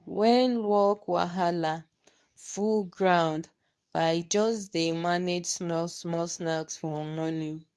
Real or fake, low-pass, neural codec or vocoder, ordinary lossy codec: fake; none; codec, 24 kHz, 0.9 kbps, WavTokenizer, medium speech release version 2; none